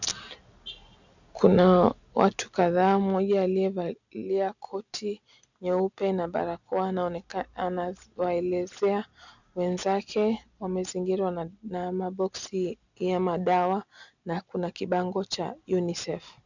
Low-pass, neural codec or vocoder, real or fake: 7.2 kHz; none; real